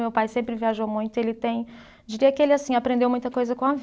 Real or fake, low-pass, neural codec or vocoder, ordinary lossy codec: real; none; none; none